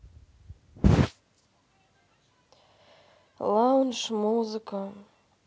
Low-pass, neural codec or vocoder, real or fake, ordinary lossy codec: none; none; real; none